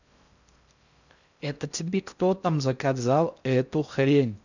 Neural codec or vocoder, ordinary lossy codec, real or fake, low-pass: codec, 16 kHz in and 24 kHz out, 0.6 kbps, FocalCodec, streaming, 4096 codes; none; fake; 7.2 kHz